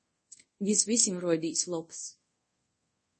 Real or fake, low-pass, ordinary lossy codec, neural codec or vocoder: fake; 10.8 kHz; MP3, 32 kbps; codec, 24 kHz, 0.5 kbps, DualCodec